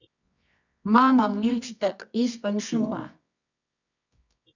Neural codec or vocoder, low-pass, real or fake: codec, 24 kHz, 0.9 kbps, WavTokenizer, medium music audio release; 7.2 kHz; fake